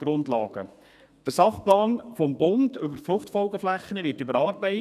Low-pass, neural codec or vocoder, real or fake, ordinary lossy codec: 14.4 kHz; codec, 32 kHz, 1.9 kbps, SNAC; fake; none